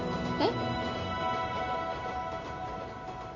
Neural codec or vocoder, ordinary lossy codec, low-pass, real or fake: none; none; 7.2 kHz; real